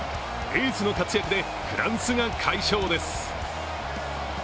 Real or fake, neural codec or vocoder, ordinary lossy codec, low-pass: real; none; none; none